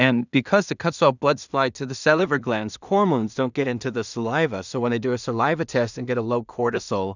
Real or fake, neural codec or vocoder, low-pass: fake; codec, 16 kHz in and 24 kHz out, 0.4 kbps, LongCat-Audio-Codec, two codebook decoder; 7.2 kHz